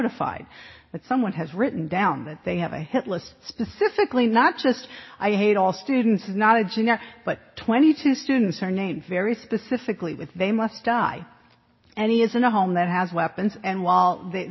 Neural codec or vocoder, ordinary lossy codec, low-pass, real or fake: none; MP3, 24 kbps; 7.2 kHz; real